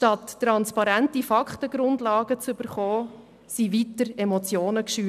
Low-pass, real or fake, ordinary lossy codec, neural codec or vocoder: 14.4 kHz; real; none; none